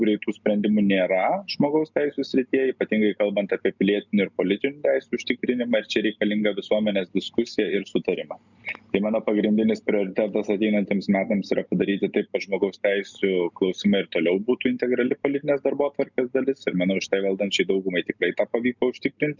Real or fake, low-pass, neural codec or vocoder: real; 7.2 kHz; none